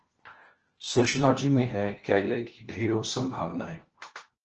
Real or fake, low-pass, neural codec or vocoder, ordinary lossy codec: fake; 7.2 kHz; codec, 16 kHz, 1 kbps, FunCodec, trained on LibriTTS, 50 frames a second; Opus, 16 kbps